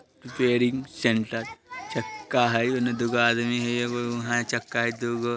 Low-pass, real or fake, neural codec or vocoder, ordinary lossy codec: none; real; none; none